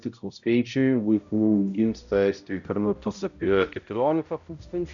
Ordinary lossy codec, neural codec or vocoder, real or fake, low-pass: MP3, 64 kbps; codec, 16 kHz, 0.5 kbps, X-Codec, HuBERT features, trained on balanced general audio; fake; 7.2 kHz